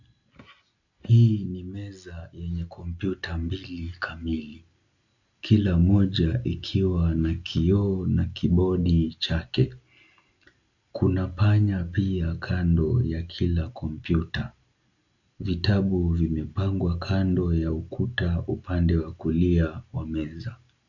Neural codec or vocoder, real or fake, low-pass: none; real; 7.2 kHz